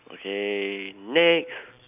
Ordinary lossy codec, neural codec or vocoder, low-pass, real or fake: none; none; 3.6 kHz; real